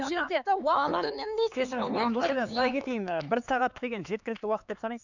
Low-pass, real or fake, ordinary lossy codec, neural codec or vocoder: 7.2 kHz; fake; none; codec, 16 kHz, 4 kbps, X-Codec, HuBERT features, trained on LibriSpeech